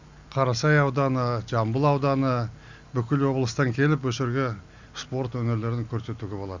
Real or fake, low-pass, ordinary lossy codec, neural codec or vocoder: real; 7.2 kHz; Opus, 64 kbps; none